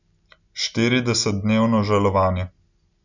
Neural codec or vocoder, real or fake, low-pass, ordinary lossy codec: none; real; 7.2 kHz; none